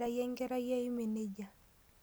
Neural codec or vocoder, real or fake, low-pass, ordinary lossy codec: none; real; none; none